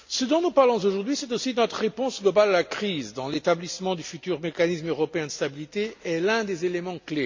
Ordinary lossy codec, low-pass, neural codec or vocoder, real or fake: MP3, 64 kbps; 7.2 kHz; none; real